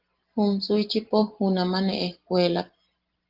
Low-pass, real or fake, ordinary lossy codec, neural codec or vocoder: 5.4 kHz; real; Opus, 16 kbps; none